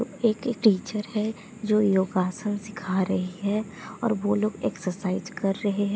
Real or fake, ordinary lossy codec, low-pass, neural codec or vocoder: real; none; none; none